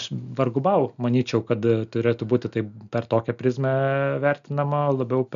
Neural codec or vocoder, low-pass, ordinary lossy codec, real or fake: none; 7.2 kHz; MP3, 96 kbps; real